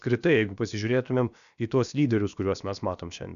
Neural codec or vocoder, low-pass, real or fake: codec, 16 kHz, about 1 kbps, DyCAST, with the encoder's durations; 7.2 kHz; fake